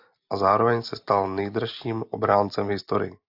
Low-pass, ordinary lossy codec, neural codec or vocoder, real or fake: 5.4 kHz; AAC, 48 kbps; none; real